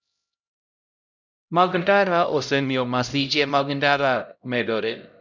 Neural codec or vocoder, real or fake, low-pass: codec, 16 kHz, 0.5 kbps, X-Codec, HuBERT features, trained on LibriSpeech; fake; 7.2 kHz